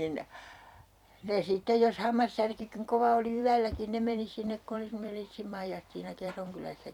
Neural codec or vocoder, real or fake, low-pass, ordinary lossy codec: vocoder, 44.1 kHz, 128 mel bands every 256 samples, BigVGAN v2; fake; 19.8 kHz; none